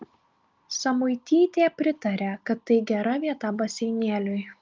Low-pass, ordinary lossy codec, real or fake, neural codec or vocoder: 7.2 kHz; Opus, 24 kbps; real; none